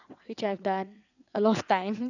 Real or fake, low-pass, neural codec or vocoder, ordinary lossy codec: fake; 7.2 kHz; vocoder, 22.05 kHz, 80 mel bands, WaveNeXt; none